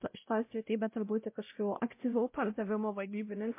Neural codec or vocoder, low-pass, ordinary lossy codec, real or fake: codec, 16 kHz, 0.5 kbps, X-Codec, WavLM features, trained on Multilingual LibriSpeech; 3.6 kHz; MP3, 24 kbps; fake